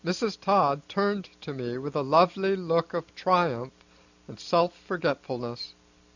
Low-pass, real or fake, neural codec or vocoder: 7.2 kHz; real; none